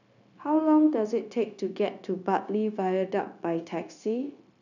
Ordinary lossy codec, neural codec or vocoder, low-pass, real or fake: none; codec, 16 kHz, 0.9 kbps, LongCat-Audio-Codec; 7.2 kHz; fake